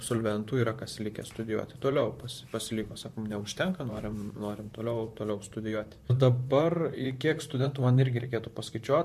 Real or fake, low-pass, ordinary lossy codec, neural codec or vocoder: fake; 14.4 kHz; MP3, 64 kbps; vocoder, 44.1 kHz, 128 mel bands, Pupu-Vocoder